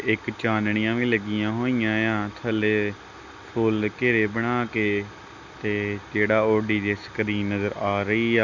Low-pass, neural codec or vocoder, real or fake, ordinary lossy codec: 7.2 kHz; none; real; Opus, 64 kbps